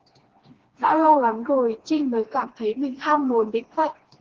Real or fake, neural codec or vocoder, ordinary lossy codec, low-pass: fake; codec, 16 kHz, 2 kbps, FreqCodec, smaller model; Opus, 16 kbps; 7.2 kHz